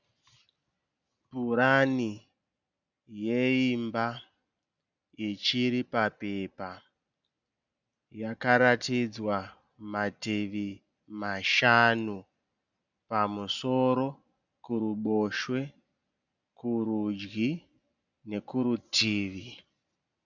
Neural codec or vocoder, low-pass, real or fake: none; 7.2 kHz; real